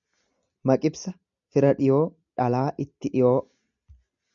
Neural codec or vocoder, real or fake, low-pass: none; real; 7.2 kHz